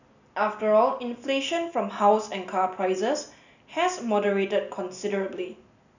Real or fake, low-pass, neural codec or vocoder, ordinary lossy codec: real; 7.2 kHz; none; none